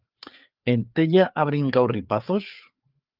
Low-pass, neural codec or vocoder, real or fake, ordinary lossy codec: 5.4 kHz; codec, 16 kHz, 4 kbps, FreqCodec, larger model; fake; Opus, 32 kbps